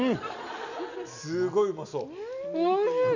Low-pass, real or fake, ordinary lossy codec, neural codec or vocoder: 7.2 kHz; real; none; none